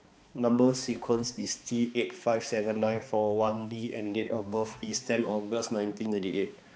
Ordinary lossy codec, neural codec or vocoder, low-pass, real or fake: none; codec, 16 kHz, 2 kbps, X-Codec, HuBERT features, trained on balanced general audio; none; fake